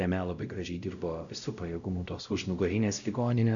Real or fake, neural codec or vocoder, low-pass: fake; codec, 16 kHz, 0.5 kbps, X-Codec, WavLM features, trained on Multilingual LibriSpeech; 7.2 kHz